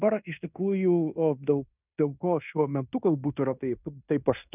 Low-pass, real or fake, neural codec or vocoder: 3.6 kHz; fake; codec, 16 kHz in and 24 kHz out, 0.9 kbps, LongCat-Audio-Codec, fine tuned four codebook decoder